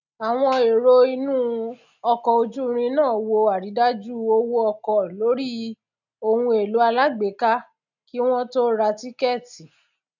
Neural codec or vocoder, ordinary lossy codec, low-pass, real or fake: none; none; 7.2 kHz; real